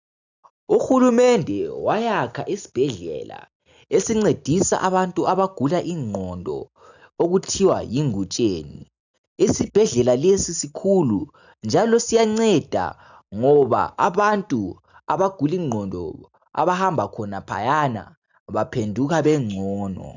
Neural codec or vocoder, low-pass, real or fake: none; 7.2 kHz; real